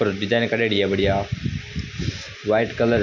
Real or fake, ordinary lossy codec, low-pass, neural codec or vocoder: real; none; 7.2 kHz; none